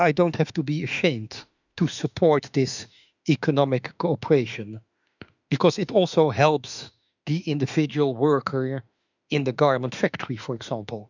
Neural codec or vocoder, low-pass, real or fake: autoencoder, 48 kHz, 32 numbers a frame, DAC-VAE, trained on Japanese speech; 7.2 kHz; fake